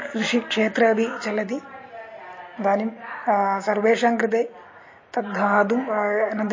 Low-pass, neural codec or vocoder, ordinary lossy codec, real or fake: 7.2 kHz; none; MP3, 32 kbps; real